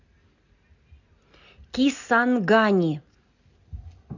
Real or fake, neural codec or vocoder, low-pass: real; none; 7.2 kHz